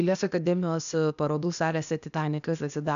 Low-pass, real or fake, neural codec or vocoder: 7.2 kHz; fake; codec, 16 kHz, 0.8 kbps, ZipCodec